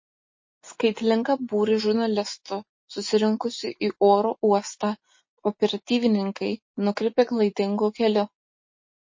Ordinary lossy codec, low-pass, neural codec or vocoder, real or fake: MP3, 32 kbps; 7.2 kHz; none; real